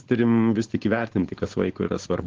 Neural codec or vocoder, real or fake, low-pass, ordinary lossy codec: codec, 16 kHz, 4.8 kbps, FACodec; fake; 7.2 kHz; Opus, 16 kbps